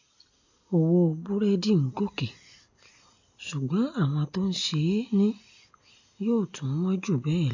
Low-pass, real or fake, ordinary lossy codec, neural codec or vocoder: 7.2 kHz; real; AAC, 48 kbps; none